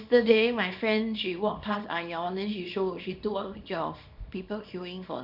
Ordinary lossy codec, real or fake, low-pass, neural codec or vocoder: none; fake; 5.4 kHz; codec, 24 kHz, 0.9 kbps, WavTokenizer, small release